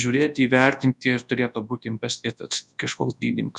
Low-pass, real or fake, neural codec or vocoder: 10.8 kHz; fake; codec, 24 kHz, 0.9 kbps, WavTokenizer, large speech release